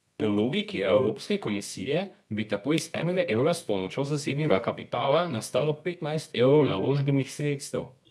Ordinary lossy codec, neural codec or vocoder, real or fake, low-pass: none; codec, 24 kHz, 0.9 kbps, WavTokenizer, medium music audio release; fake; none